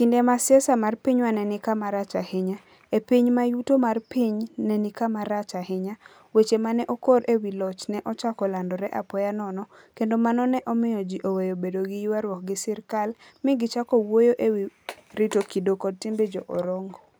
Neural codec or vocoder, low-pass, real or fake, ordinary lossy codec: none; none; real; none